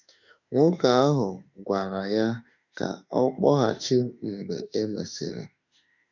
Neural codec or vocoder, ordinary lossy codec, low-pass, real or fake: autoencoder, 48 kHz, 32 numbers a frame, DAC-VAE, trained on Japanese speech; none; 7.2 kHz; fake